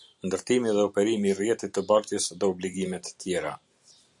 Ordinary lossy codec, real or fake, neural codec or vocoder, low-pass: MP3, 96 kbps; fake; vocoder, 44.1 kHz, 128 mel bands every 512 samples, BigVGAN v2; 10.8 kHz